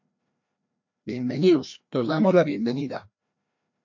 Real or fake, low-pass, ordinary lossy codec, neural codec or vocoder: fake; 7.2 kHz; MP3, 48 kbps; codec, 16 kHz, 1 kbps, FreqCodec, larger model